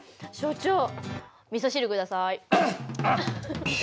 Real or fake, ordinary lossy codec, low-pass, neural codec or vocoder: real; none; none; none